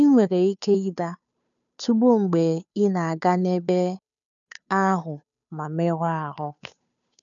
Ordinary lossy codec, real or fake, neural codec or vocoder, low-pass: none; fake; codec, 16 kHz, 2 kbps, FunCodec, trained on Chinese and English, 25 frames a second; 7.2 kHz